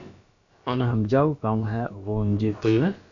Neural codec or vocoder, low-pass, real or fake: codec, 16 kHz, about 1 kbps, DyCAST, with the encoder's durations; 7.2 kHz; fake